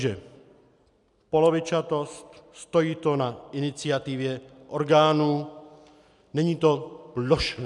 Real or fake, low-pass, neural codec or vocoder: real; 10.8 kHz; none